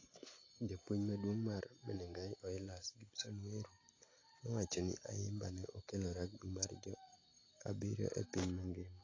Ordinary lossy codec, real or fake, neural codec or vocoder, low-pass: AAC, 32 kbps; real; none; 7.2 kHz